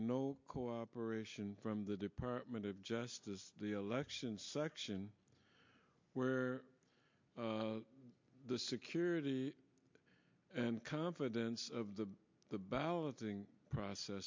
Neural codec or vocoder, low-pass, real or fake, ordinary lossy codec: none; 7.2 kHz; real; MP3, 48 kbps